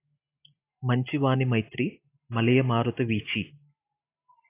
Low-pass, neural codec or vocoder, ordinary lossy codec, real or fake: 3.6 kHz; none; AAC, 24 kbps; real